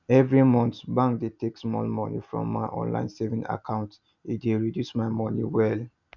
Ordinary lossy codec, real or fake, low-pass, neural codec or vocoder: none; real; 7.2 kHz; none